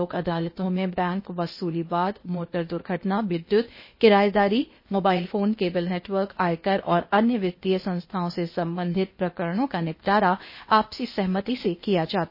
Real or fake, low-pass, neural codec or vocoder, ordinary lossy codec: fake; 5.4 kHz; codec, 16 kHz, 0.8 kbps, ZipCodec; MP3, 24 kbps